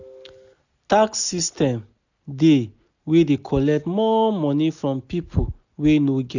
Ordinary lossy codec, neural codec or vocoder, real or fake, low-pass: none; none; real; 7.2 kHz